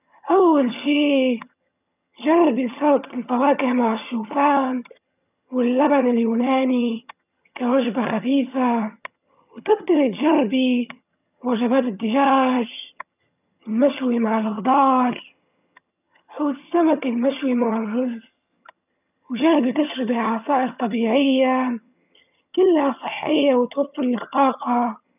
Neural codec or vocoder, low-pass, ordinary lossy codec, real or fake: vocoder, 22.05 kHz, 80 mel bands, HiFi-GAN; 3.6 kHz; none; fake